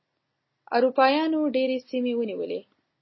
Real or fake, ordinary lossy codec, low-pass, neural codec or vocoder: real; MP3, 24 kbps; 7.2 kHz; none